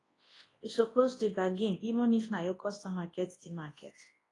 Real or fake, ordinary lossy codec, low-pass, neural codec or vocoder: fake; AAC, 32 kbps; 10.8 kHz; codec, 24 kHz, 0.9 kbps, WavTokenizer, large speech release